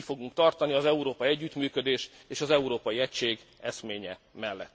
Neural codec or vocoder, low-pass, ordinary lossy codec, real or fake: none; none; none; real